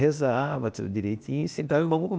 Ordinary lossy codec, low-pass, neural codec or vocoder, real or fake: none; none; codec, 16 kHz, 0.8 kbps, ZipCodec; fake